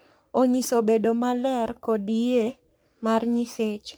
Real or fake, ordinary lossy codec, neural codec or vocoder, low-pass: fake; none; codec, 44.1 kHz, 3.4 kbps, Pupu-Codec; none